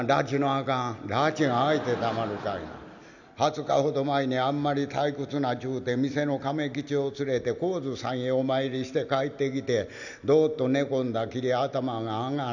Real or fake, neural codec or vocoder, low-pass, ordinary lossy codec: real; none; 7.2 kHz; none